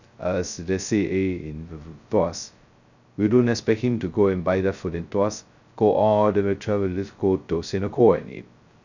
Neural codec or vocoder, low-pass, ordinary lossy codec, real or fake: codec, 16 kHz, 0.2 kbps, FocalCodec; 7.2 kHz; none; fake